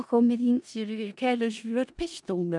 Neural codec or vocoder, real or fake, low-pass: codec, 16 kHz in and 24 kHz out, 0.4 kbps, LongCat-Audio-Codec, four codebook decoder; fake; 10.8 kHz